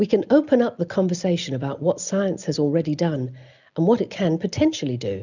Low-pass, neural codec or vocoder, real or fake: 7.2 kHz; none; real